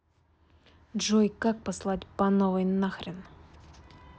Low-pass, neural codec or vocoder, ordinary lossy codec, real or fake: none; none; none; real